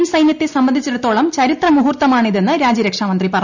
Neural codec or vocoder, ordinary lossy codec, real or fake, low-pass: none; none; real; 7.2 kHz